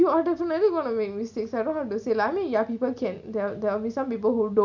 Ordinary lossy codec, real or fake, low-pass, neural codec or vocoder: none; real; 7.2 kHz; none